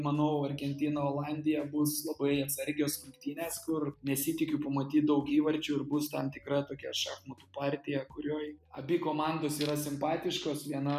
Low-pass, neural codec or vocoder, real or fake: 14.4 kHz; none; real